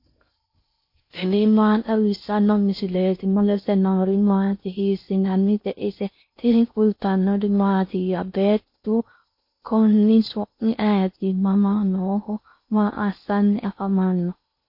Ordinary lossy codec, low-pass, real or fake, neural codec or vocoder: MP3, 32 kbps; 5.4 kHz; fake; codec, 16 kHz in and 24 kHz out, 0.6 kbps, FocalCodec, streaming, 4096 codes